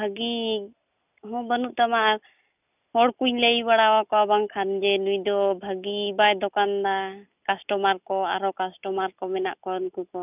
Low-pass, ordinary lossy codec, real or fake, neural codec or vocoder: 3.6 kHz; none; real; none